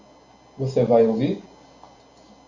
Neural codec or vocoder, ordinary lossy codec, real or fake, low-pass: none; Opus, 64 kbps; real; 7.2 kHz